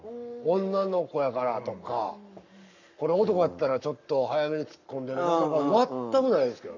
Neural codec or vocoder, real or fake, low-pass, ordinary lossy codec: codec, 44.1 kHz, 7.8 kbps, Pupu-Codec; fake; 7.2 kHz; none